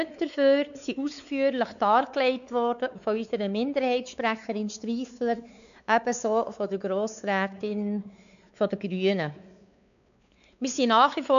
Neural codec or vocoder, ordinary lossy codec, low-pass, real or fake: codec, 16 kHz, 4 kbps, X-Codec, WavLM features, trained on Multilingual LibriSpeech; none; 7.2 kHz; fake